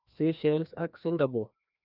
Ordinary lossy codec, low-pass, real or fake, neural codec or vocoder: none; 5.4 kHz; fake; codec, 16 kHz, 1 kbps, FreqCodec, larger model